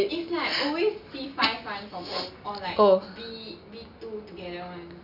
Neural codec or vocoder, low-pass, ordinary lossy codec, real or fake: none; 5.4 kHz; none; real